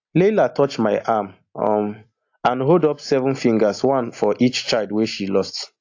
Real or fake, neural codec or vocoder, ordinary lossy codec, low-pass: real; none; AAC, 48 kbps; 7.2 kHz